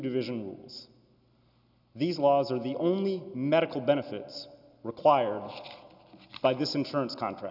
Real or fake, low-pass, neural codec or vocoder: real; 5.4 kHz; none